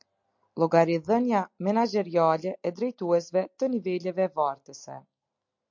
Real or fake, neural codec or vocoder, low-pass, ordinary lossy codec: real; none; 7.2 kHz; MP3, 48 kbps